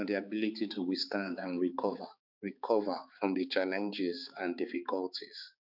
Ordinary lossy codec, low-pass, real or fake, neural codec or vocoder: none; 5.4 kHz; fake; codec, 16 kHz, 4 kbps, X-Codec, HuBERT features, trained on balanced general audio